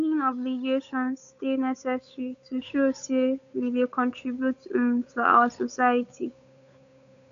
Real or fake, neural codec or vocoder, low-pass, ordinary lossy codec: fake; codec, 16 kHz, 8 kbps, FunCodec, trained on Chinese and English, 25 frames a second; 7.2 kHz; AAC, 96 kbps